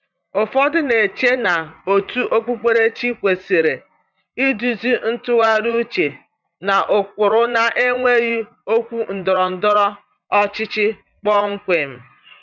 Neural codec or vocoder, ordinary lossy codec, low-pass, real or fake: vocoder, 44.1 kHz, 80 mel bands, Vocos; none; 7.2 kHz; fake